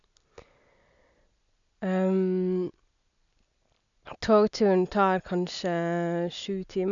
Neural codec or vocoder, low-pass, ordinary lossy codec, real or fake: none; 7.2 kHz; none; real